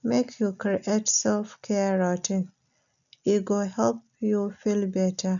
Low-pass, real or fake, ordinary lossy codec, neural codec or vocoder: 7.2 kHz; real; none; none